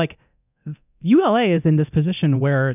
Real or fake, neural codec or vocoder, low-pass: fake; codec, 24 kHz, 0.9 kbps, DualCodec; 3.6 kHz